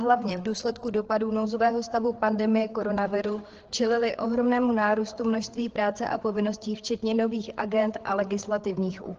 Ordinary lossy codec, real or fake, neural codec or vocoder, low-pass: Opus, 16 kbps; fake; codec, 16 kHz, 4 kbps, FreqCodec, larger model; 7.2 kHz